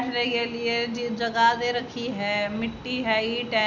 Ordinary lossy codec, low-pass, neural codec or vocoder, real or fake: none; 7.2 kHz; none; real